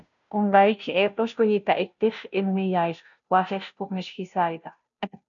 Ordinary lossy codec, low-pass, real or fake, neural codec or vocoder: MP3, 96 kbps; 7.2 kHz; fake; codec, 16 kHz, 0.5 kbps, FunCodec, trained on Chinese and English, 25 frames a second